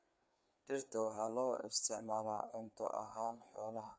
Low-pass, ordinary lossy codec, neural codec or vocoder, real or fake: none; none; codec, 16 kHz, 4 kbps, FreqCodec, larger model; fake